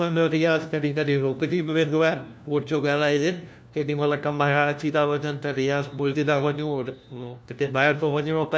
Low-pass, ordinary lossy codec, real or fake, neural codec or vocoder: none; none; fake; codec, 16 kHz, 1 kbps, FunCodec, trained on LibriTTS, 50 frames a second